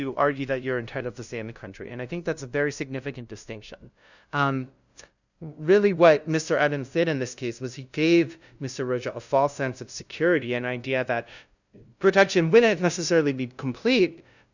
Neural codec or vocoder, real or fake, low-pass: codec, 16 kHz, 0.5 kbps, FunCodec, trained on LibriTTS, 25 frames a second; fake; 7.2 kHz